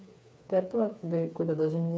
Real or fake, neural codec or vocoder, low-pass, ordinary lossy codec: fake; codec, 16 kHz, 4 kbps, FreqCodec, smaller model; none; none